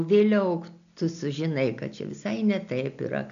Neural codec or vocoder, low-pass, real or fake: none; 7.2 kHz; real